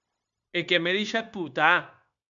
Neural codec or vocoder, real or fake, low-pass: codec, 16 kHz, 0.9 kbps, LongCat-Audio-Codec; fake; 7.2 kHz